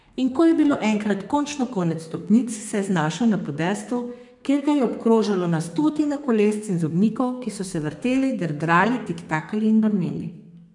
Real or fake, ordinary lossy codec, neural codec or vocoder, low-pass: fake; MP3, 96 kbps; codec, 32 kHz, 1.9 kbps, SNAC; 10.8 kHz